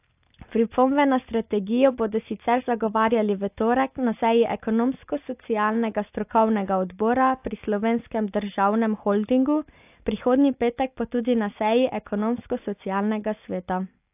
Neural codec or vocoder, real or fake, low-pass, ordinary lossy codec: none; real; 3.6 kHz; AAC, 32 kbps